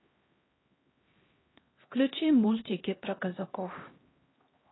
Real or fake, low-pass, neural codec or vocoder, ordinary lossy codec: fake; 7.2 kHz; codec, 16 kHz, 1 kbps, X-Codec, HuBERT features, trained on LibriSpeech; AAC, 16 kbps